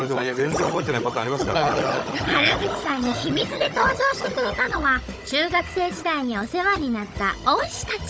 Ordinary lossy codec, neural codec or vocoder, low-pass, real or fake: none; codec, 16 kHz, 16 kbps, FunCodec, trained on Chinese and English, 50 frames a second; none; fake